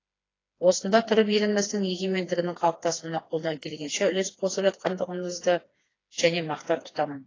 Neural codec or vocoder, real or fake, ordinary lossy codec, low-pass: codec, 16 kHz, 2 kbps, FreqCodec, smaller model; fake; AAC, 32 kbps; 7.2 kHz